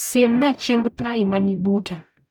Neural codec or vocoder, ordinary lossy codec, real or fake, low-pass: codec, 44.1 kHz, 0.9 kbps, DAC; none; fake; none